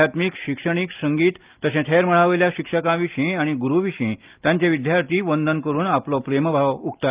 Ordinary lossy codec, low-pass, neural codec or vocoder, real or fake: Opus, 32 kbps; 3.6 kHz; none; real